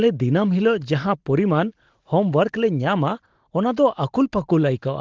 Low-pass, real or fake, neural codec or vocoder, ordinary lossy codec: 7.2 kHz; real; none; Opus, 16 kbps